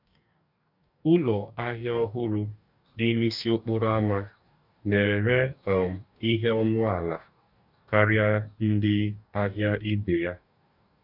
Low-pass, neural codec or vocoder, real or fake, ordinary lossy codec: 5.4 kHz; codec, 44.1 kHz, 2.6 kbps, DAC; fake; none